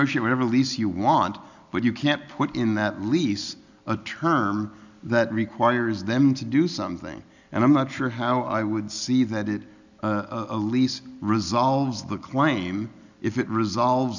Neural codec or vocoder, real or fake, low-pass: none; real; 7.2 kHz